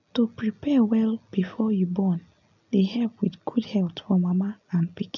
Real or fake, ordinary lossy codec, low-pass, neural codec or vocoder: real; none; 7.2 kHz; none